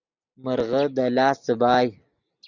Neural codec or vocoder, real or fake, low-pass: none; real; 7.2 kHz